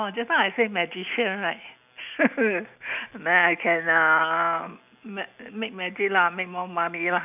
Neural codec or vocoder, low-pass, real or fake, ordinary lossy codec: none; 3.6 kHz; real; none